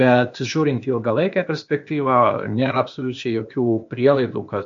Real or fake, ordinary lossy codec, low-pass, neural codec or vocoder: fake; MP3, 48 kbps; 7.2 kHz; codec, 16 kHz, 0.8 kbps, ZipCodec